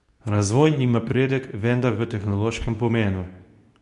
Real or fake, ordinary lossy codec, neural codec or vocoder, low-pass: fake; none; codec, 24 kHz, 0.9 kbps, WavTokenizer, medium speech release version 2; 10.8 kHz